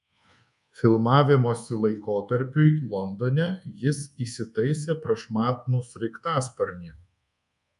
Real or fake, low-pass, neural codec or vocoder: fake; 10.8 kHz; codec, 24 kHz, 1.2 kbps, DualCodec